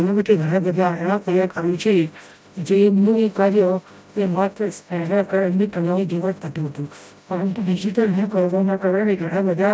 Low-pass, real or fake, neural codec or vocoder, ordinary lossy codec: none; fake; codec, 16 kHz, 0.5 kbps, FreqCodec, smaller model; none